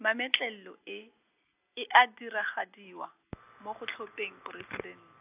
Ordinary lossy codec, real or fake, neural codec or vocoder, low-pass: AAC, 24 kbps; real; none; 3.6 kHz